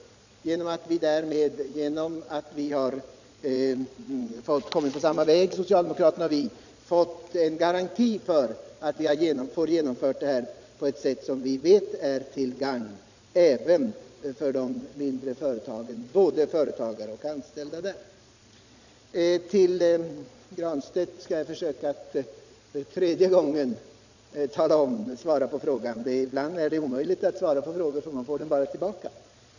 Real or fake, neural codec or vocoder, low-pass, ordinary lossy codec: fake; vocoder, 22.05 kHz, 80 mel bands, Vocos; 7.2 kHz; none